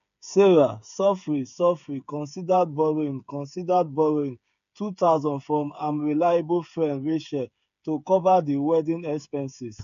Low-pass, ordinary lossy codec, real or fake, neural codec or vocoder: 7.2 kHz; AAC, 96 kbps; fake; codec, 16 kHz, 8 kbps, FreqCodec, smaller model